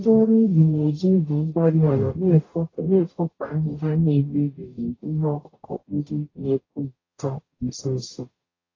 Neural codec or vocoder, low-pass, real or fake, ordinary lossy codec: codec, 44.1 kHz, 0.9 kbps, DAC; 7.2 kHz; fake; AAC, 32 kbps